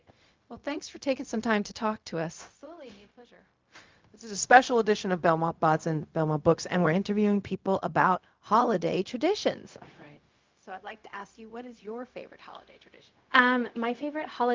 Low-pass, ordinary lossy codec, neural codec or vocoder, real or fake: 7.2 kHz; Opus, 24 kbps; codec, 16 kHz, 0.4 kbps, LongCat-Audio-Codec; fake